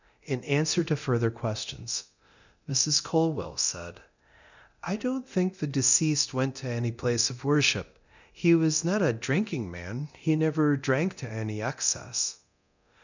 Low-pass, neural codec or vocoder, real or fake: 7.2 kHz; codec, 24 kHz, 0.9 kbps, DualCodec; fake